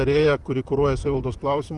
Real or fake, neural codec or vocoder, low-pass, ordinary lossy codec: fake; vocoder, 44.1 kHz, 128 mel bands, Pupu-Vocoder; 10.8 kHz; Opus, 32 kbps